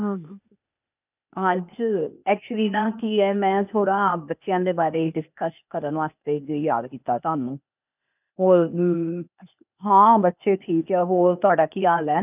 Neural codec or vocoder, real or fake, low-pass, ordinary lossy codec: codec, 16 kHz, 0.8 kbps, ZipCodec; fake; 3.6 kHz; none